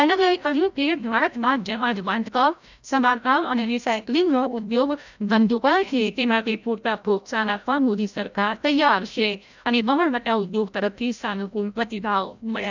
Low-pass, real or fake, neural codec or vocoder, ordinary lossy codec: 7.2 kHz; fake; codec, 16 kHz, 0.5 kbps, FreqCodec, larger model; none